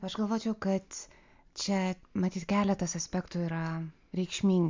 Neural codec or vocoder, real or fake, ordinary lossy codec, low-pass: none; real; MP3, 64 kbps; 7.2 kHz